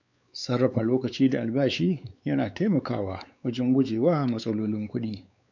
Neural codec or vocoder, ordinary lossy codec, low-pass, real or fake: codec, 16 kHz, 4 kbps, X-Codec, WavLM features, trained on Multilingual LibriSpeech; none; 7.2 kHz; fake